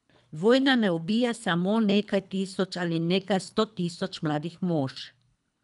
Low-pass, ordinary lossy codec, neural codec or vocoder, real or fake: 10.8 kHz; none; codec, 24 kHz, 3 kbps, HILCodec; fake